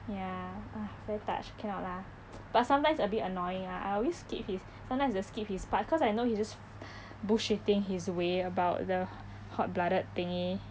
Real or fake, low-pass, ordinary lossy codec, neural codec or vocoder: real; none; none; none